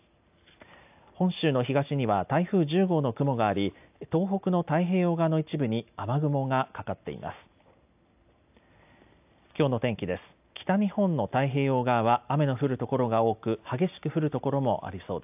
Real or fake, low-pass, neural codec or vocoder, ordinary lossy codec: real; 3.6 kHz; none; AAC, 32 kbps